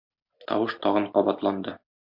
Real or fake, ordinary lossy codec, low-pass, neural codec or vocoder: real; MP3, 48 kbps; 5.4 kHz; none